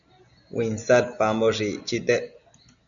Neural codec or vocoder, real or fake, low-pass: none; real; 7.2 kHz